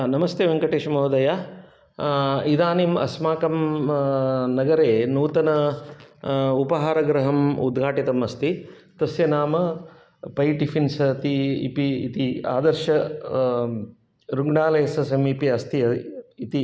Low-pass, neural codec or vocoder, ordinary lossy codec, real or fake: none; none; none; real